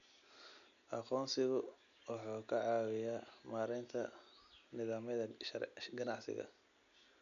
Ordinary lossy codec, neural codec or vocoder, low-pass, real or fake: none; none; 7.2 kHz; real